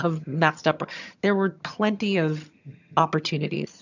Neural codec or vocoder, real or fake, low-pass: vocoder, 22.05 kHz, 80 mel bands, HiFi-GAN; fake; 7.2 kHz